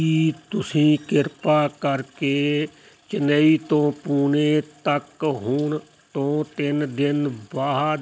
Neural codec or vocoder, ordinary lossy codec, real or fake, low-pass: none; none; real; none